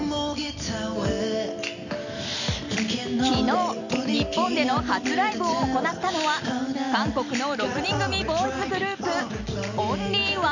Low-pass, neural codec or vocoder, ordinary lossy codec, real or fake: 7.2 kHz; none; none; real